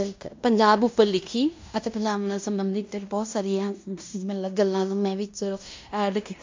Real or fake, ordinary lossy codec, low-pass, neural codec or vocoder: fake; MP3, 64 kbps; 7.2 kHz; codec, 16 kHz in and 24 kHz out, 0.9 kbps, LongCat-Audio-Codec, fine tuned four codebook decoder